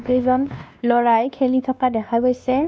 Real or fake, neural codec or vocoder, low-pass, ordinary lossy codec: fake; codec, 16 kHz, 2 kbps, X-Codec, WavLM features, trained on Multilingual LibriSpeech; none; none